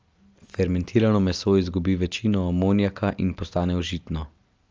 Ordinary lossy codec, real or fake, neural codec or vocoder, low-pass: Opus, 24 kbps; real; none; 7.2 kHz